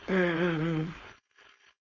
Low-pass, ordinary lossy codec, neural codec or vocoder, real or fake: 7.2 kHz; Opus, 64 kbps; codec, 16 kHz, 4.8 kbps, FACodec; fake